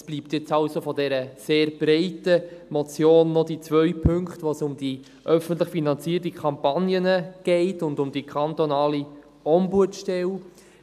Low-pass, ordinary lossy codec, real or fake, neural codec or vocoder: 14.4 kHz; none; real; none